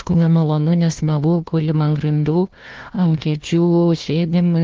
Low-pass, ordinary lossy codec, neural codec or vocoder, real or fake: 7.2 kHz; Opus, 16 kbps; codec, 16 kHz, 1 kbps, FunCodec, trained on Chinese and English, 50 frames a second; fake